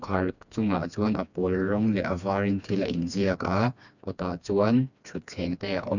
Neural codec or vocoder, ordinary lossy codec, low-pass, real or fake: codec, 16 kHz, 2 kbps, FreqCodec, smaller model; none; 7.2 kHz; fake